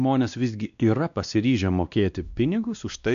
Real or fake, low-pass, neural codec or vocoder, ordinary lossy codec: fake; 7.2 kHz; codec, 16 kHz, 1 kbps, X-Codec, WavLM features, trained on Multilingual LibriSpeech; MP3, 96 kbps